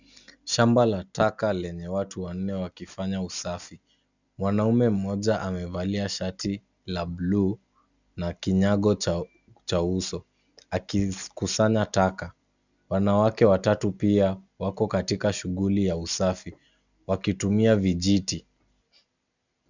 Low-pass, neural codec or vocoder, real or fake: 7.2 kHz; none; real